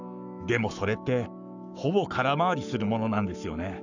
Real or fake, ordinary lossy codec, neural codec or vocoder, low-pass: fake; none; codec, 44.1 kHz, 7.8 kbps, Pupu-Codec; 7.2 kHz